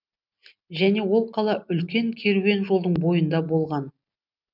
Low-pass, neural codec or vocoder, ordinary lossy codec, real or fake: 5.4 kHz; none; none; real